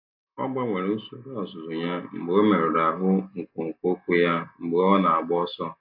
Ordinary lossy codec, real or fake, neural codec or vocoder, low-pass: AAC, 48 kbps; real; none; 5.4 kHz